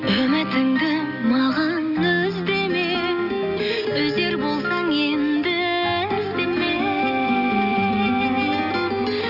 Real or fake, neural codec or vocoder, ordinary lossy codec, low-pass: real; none; none; 5.4 kHz